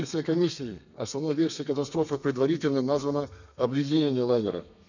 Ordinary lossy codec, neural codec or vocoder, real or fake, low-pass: none; codec, 32 kHz, 1.9 kbps, SNAC; fake; 7.2 kHz